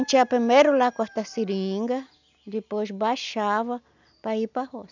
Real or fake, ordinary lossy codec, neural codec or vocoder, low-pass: real; none; none; 7.2 kHz